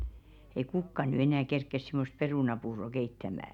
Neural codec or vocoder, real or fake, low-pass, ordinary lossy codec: none; real; 19.8 kHz; none